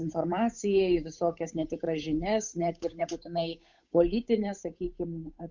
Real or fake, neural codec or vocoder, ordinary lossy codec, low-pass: fake; codec, 16 kHz, 8 kbps, FunCodec, trained on Chinese and English, 25 frames a second; Opus, 64 kbps; 7.2 kHz